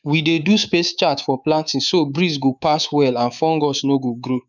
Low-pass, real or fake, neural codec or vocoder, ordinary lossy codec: 7.2 kHz; fake; codec, 24 kHz, 3.1 kbps, DualCodec; none